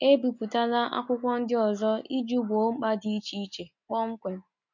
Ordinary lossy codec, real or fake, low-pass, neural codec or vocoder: none; real; 7.2 kHz; none